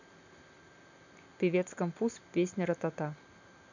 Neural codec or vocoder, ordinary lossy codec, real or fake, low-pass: none; none; real; 7.2 kHz